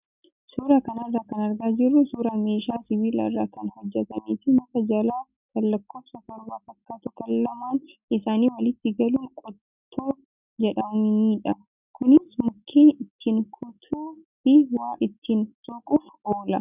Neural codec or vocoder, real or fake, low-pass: none; real; 3.6 kHz